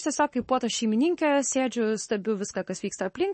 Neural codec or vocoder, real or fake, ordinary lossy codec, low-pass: codec, 44.1 kHz, 7.8 kbps, Pupu-Codec; fake; MP3, 32 kbps; 10.8 kHz